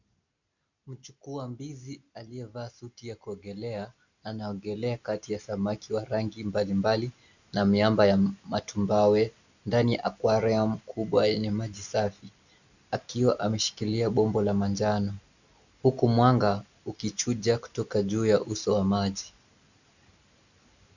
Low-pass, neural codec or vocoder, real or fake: 7.2 kHz; none; real